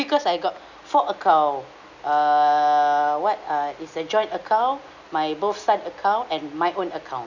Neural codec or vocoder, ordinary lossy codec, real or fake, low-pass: none; none; real; 7.2 kHz